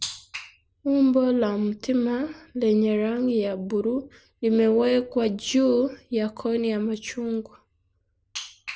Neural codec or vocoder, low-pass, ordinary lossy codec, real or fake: none; none; none; real